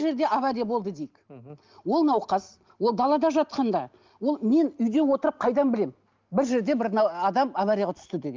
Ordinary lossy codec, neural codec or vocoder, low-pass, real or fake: Opus, 32 kbps; none; 7.2 kHz; real